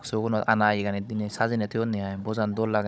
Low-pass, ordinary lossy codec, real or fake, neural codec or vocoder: none; none; fake; codec, 16 kHz, 16 kbps, FunCodec, trained on Chinese and English, 50 frames a second